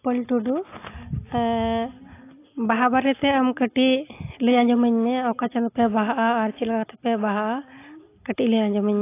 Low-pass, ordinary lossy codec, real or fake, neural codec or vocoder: 3.6 kHz; AAC, 24 kbps; real; none